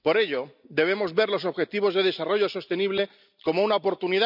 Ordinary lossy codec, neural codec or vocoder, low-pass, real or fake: none; none; 5.4 kHz; real